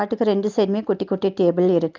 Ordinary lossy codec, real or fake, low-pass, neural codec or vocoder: Opus, 24 kbps; real; 7.2 kHz; none